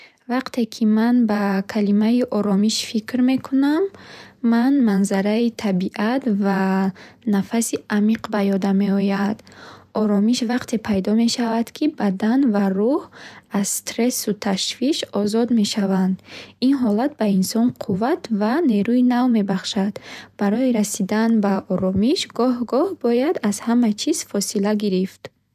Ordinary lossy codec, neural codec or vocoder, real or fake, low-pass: none; vocoder, 44.1 kHz, 128 mel bands every 512 samples, BigVGAN v2; fake; 14.4 kHz